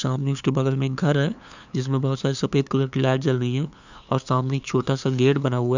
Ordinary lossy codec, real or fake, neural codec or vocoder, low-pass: none; fake; codec, 16 kHz, 2 kbps, FunCodec, trained on LibriTTS, 25 frames a second; 7.2 kHz